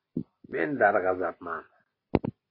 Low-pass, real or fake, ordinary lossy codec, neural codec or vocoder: 5.4 kHz; real; MP3, 24 kbps; none